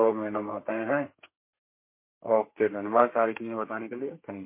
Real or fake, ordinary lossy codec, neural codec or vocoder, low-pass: fake; MP3, 24 kbps; codec, 32 kHz, 1.9 kbps, SNAC; 3.6 kHz